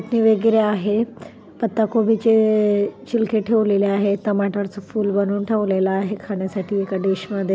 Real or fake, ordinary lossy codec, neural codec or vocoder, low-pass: real; none; none; none